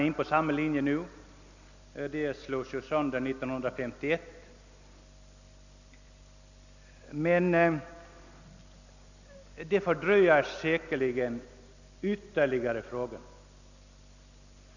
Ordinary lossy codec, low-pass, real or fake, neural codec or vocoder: none; 7.2 kHz; real; none